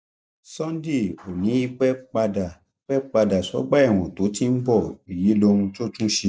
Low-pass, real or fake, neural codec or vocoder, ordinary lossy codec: none; real; none; none